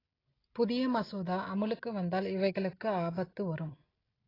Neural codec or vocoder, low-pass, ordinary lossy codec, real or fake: none; 5.4 kHz; AAC, 24 kbps; real